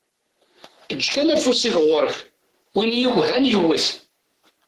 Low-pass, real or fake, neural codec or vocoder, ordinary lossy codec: 14.4 kHz; fake; codec, 44.1 kHz, 3.4 kbps, Pupu-Codec; Opus, 16 kbps